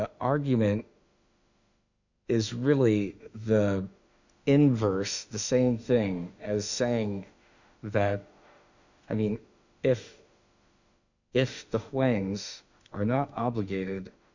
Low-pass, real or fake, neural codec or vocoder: 7.2 kHz; fake; autoencoder, 48 kHz, 32 numbers a frame, DAC-VAE, trained on Japanese speech